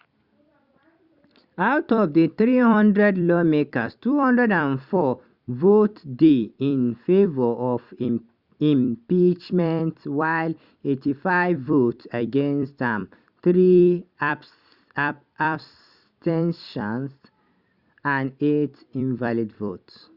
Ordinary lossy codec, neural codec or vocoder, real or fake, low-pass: Opus, 64 kbps; vocoder, 44.1 kHz, 128 mel bands every 256 samples, BigVGAN v2; fake; 5.4 kHz